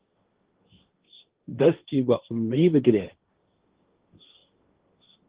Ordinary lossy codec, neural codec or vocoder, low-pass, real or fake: Opus, 16 kbps; codec, 16 kHz, 1.1 kbps, Voila-Tokenizer; 3.6 kHz; fake